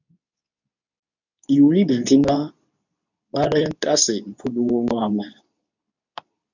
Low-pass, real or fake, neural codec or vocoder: 7.2 kHz; fake; codec, 24 kHz, 0.9 kbps, WavTokenizer, medium speech release version 2